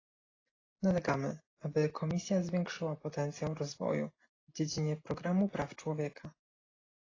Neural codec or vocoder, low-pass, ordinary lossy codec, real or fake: none; 7.2 kHz; AAC, 32 kbps; real